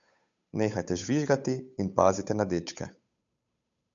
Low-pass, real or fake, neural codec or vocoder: 7.2 kHz; fake; codec, 16 kHz, 8 kbps, FunCodec, trained on Chinese and English, 25 frames a second